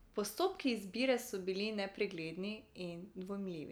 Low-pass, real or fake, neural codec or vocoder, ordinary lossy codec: none; real; none; none